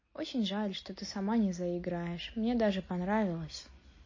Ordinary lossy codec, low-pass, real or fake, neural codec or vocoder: MP3, 32 kbps; 7.2 kHz; real; none